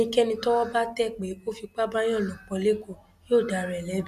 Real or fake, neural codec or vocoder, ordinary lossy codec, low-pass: real; none; none; 14.4 kHz